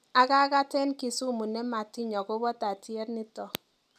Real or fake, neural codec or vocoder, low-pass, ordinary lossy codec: real; none; 14.4 kHz; none